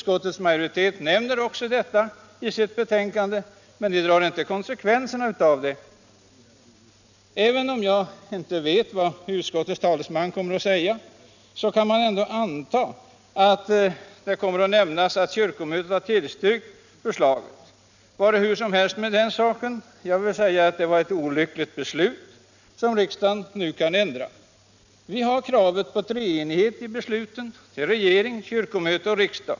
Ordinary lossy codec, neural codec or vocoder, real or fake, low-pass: none; none; real; 7.2 kHz